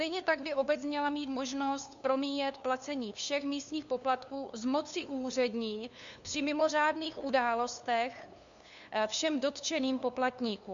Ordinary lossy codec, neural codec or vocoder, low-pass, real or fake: Opus, 64 kbps; codec, 16 kHz, 2 kbps, FunCodec, trained on LibriTTS, 25 frames a second; 7.2 kHz; fake